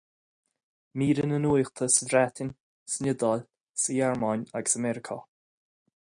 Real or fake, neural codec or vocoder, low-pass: real; none; 9.9 kHz